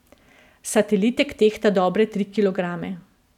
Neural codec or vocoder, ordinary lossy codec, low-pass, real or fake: none; none; 19.8 kHz; real